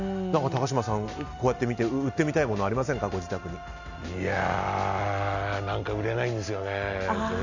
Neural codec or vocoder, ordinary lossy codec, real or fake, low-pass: none; none; real; 7.2 kHz